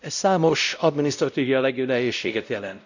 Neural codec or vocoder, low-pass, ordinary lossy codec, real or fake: codec, 16 kHz, 0.5 kbps, X-Codec, WavLM features, trained on Multilingual LibriSpeech; 7.2 kHz; none; fake